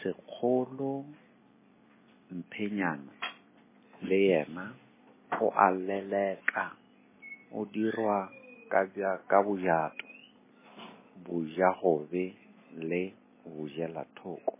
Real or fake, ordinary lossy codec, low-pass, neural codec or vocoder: real; MP3, 16 kbps; 3.6 kHz; none